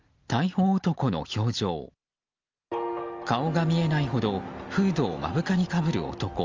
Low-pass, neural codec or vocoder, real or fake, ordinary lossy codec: 7.2 kHz; none; real; Opus, 32 kbps